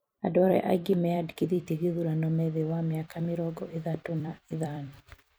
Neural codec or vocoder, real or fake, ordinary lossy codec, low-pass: vocoder, 44.1 kHz, 128 mel bands every 256 samples, BigVGAN v2; fake; none; none